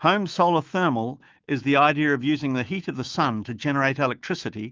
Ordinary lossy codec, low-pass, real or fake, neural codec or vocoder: Opus, 24 kbps; 7.2 kHz; fake; autoencoder, 48 kHz, 128 numbers a frame, DAC-VAE, trained on Japanese speech